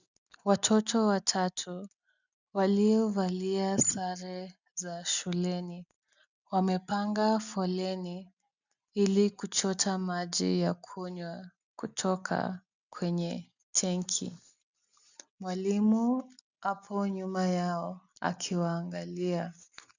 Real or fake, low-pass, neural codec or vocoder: real; 7.2 kHz; none